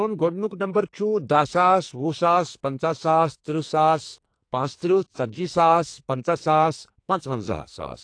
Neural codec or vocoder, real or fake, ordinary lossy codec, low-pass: codec, 44.1 kHz, 2.6 kbps, SNAC; fake; AAC, 48 kbps; 9.9 kHz